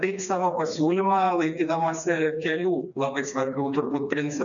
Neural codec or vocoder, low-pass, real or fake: codec, 16 kHz, 2 kbps, FreqCodec, smaller model; 7.2 kHz; fake